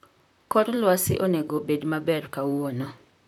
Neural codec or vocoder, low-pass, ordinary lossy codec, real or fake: vocoder, 44.1 kHz, 128 mel bands, Pupu-Vocoder; 19.8 kHz; none; fake